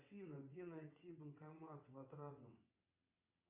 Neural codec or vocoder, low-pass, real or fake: vocoder, 22.05 kHz, 80 mel bands, WaveNeXt; 3.6 kHz; fake